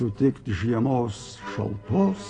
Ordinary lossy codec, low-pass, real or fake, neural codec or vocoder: AAC, 32 kbps; 9.9 kHz; real; none